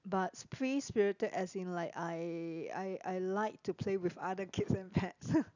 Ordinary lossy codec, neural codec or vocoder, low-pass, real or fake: none; none; 7.2 kHz; real